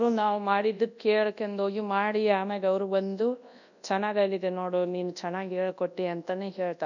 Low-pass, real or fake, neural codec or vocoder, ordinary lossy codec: 7.2 kHz; fake; codec, 24 kHz, 0.9 kbps, WavTokenizer, large speech release; none